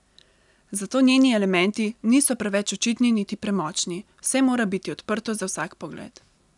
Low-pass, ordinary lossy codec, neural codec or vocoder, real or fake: 10.8 kHz; none; none; real